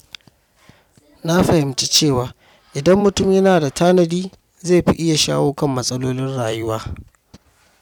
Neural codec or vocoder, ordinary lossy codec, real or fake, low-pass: vocoder, 44.1 kHz, 128 mel bands every 256 samples, BigVGAN v2; none; fake; 19.8 kHz